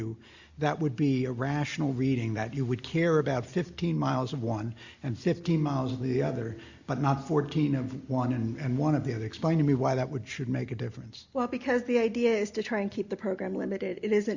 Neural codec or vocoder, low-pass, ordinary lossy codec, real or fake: none; 7.2 kHz; Opus, 64 kbps; real